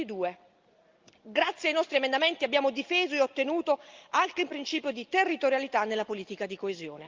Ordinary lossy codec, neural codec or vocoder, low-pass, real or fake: Opus, 32 kbps; none; 7.2 kHz; real